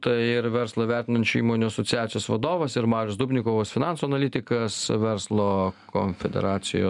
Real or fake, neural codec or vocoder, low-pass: real; none; 10.8 kHz